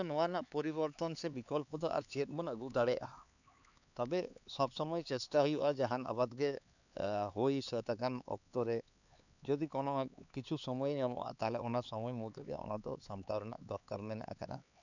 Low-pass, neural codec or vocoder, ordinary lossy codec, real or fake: 7.2 kHz; codec, 16 kHz, 4 kbps, X-Codec, HuBERT features, trained on LibriSpeech; none; fake